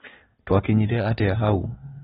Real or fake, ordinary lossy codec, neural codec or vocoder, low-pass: real; AAC, 16 kbps; none; 14.4 kHz